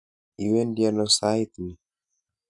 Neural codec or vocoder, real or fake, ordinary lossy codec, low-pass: none; real; none; 10.8 kHz